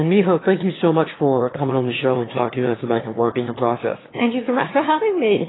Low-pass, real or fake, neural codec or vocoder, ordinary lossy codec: 7.2 kHz; fake; autoencoder, 22.05 kHz, a latent of 192 numbers a frame, VITS, trained on one speaker; AAC, 16 kbps